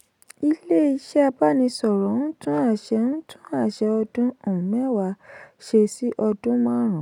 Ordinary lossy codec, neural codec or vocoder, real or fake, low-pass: none; none; real; 19.8 kHz